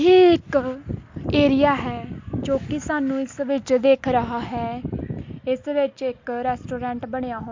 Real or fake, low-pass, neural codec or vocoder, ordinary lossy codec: real; 7.2 kHz; none; MP3, 48 kbps